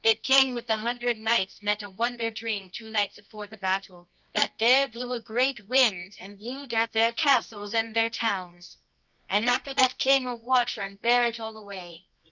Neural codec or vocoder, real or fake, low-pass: codec, 24 kHz, 0.9 kbps, WavTokenizer, medium music audio release; fake; 7.2 kHz